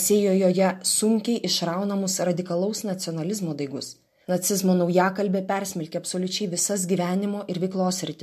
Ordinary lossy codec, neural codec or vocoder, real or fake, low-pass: MP3, 64 kbps; none; real; 14.4 kHz